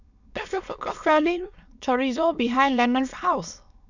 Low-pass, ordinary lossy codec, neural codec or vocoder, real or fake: 7.2 kHz; none; autoencoder, 22.05 kHz, a latent of 192 numbers a frame, VITS, trained on many speakers; fake